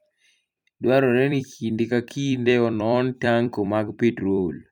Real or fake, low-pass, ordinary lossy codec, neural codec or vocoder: fake; 19.8 kHz; none; vocoder, 44.1 kHz, 128 mel bands every 256 samples, BigVGAN v2